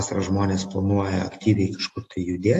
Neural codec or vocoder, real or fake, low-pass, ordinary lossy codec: none; real; 14.4 kHz; AAC, 48 kbps